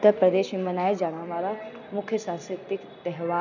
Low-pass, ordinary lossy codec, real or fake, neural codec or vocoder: 7.2 kHz; none; real; none